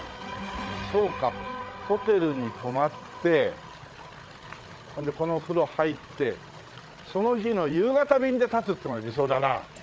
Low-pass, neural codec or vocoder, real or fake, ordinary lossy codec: none; codec, 16 kHz, 8 kbps, FreqCodec, larger model; fake; none